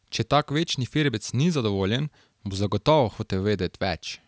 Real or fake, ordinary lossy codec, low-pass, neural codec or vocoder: real; none; none; none